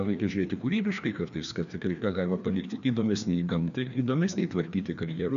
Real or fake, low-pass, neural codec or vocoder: fake; 7.2 kHz; codec, 16 kHz, 2 kbps, FreqCodec, larger model